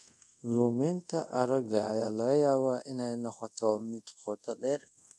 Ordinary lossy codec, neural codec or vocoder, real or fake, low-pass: none; codec, 24 kHz, 0.5 kbps, DualCodec; fake; none